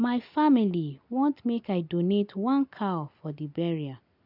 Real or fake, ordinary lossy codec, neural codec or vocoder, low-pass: real; none; none; 5.4 kHz